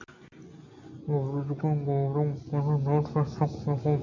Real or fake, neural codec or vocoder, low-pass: real; none; 7.2 kHz